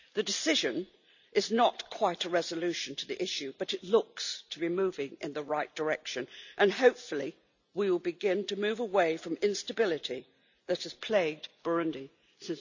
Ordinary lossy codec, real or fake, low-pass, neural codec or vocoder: none; real; 7.2 kHz; none